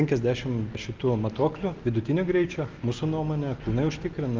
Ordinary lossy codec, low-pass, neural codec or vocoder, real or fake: Opus, 32 kbps; 7.2 kHz; none; real